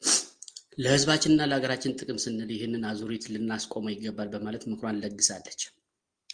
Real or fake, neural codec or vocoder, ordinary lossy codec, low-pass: real; none; Opus, 24 kbps; 9.9 kHz